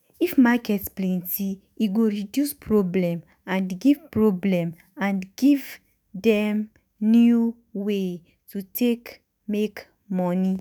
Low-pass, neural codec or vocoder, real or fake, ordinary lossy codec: none; autoencoder, 48 kHz, 128 numbers a frame, DAC-VAE, trained on Japanese speech; fake; none